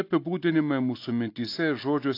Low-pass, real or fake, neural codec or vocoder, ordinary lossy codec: 5.4 kHz; real; none; AAC, 32 kbps